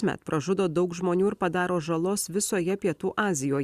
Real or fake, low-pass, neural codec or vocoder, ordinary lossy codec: real; 14.4 kHz; none; Opus, 64 kbps